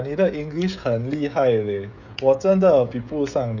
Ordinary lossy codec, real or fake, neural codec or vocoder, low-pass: none; fake; codec, 16 kHz, 16 kbps, FreqCodec, smaller model; 7.2 kHz